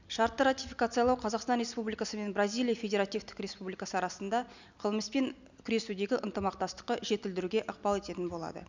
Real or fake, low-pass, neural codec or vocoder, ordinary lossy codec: real; 7.2 kHz; none; none